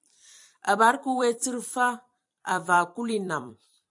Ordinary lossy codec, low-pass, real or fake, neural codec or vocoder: AAC, 64 kbps; 10.8 kHz; fake; vocoder, 44.1 kHz, 128 mel bands every 256 samples, BigVGAN v2